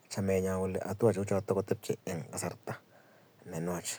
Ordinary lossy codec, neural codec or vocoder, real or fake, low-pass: none; none; real; none